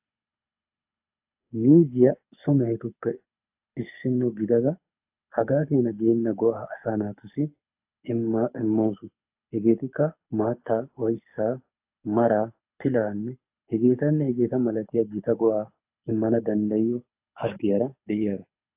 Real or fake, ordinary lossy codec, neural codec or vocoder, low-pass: fake; AAC, 32 kbps; codec, 24 kHz, 6 kbps, HILCodec; 3.6 kHz